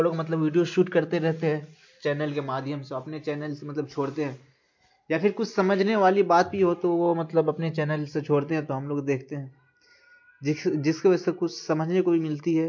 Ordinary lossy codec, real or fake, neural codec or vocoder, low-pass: MP3, 48 kbps; real; none; 7.2 kHz